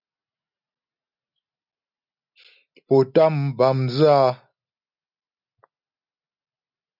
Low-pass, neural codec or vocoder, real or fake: 5.4 kHz; none; real